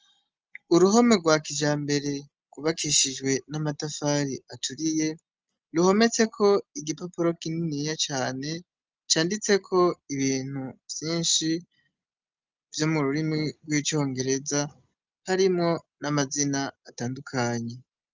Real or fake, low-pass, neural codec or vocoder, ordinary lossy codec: real; 7.2 kHz; none; Opus, 24 kbps